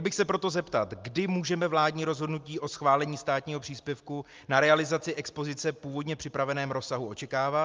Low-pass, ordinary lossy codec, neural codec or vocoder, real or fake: 7.2 kHz; Opus, 32 kbps; none; real